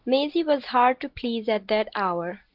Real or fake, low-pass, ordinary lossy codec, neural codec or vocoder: real; 5.4 kHz; Opus, 16 kbps; none